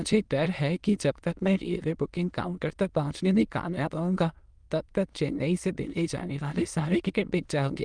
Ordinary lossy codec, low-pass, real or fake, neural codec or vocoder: Opus, 32 kbps; 9.9 kHz; fake; autoencoder, 22.05 kHz, a latent of 192 numbers a frame, VITS, trained on many speakers